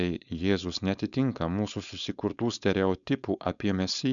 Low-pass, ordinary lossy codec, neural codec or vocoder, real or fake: 7.2 kHz; MP3, 96 kbps; codec, 16 kHz, 4.8 kbps, FACodec; fake